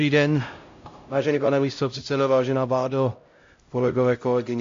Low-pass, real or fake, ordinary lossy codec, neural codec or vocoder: 7.2 kHz; fake; AAC, 48 kbps; codec, 16 kHz, 0.5 kbps, X-Codec, HuBERT features, trained on LibriSpeech